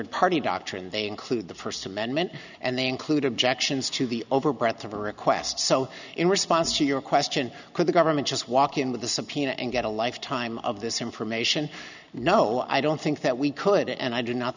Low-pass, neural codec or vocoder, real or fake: 7.2 kHz; none; real